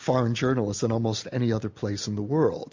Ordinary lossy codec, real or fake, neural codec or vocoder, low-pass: MP3, 48 kbps; real; none; 7.2 kHz